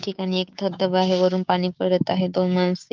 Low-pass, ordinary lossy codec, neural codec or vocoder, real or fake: 7.2 kHz; Opus, 24 kbps; codec, 16 kHz, 6 kbps, DAC; fake